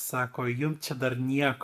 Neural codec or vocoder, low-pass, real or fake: none; 14.4 kHz; real